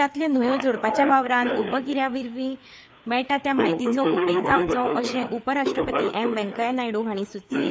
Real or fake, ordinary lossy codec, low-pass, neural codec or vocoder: fake; none; none; codec, 16 kHz, 4 kbps, FreqCodec, larger model